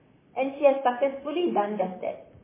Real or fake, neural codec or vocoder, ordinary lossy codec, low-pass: fake; vocoder, 44.1 kHz, 128 mel bands, Pupu-Vocoder; MP3, 16 kbps; 3.6 kHz